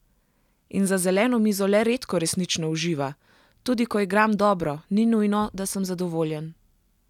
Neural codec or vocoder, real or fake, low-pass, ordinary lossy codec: none; real; 19.8 kHz; none